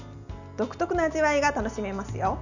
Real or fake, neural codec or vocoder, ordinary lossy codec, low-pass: real; none; none; 7.2 kHz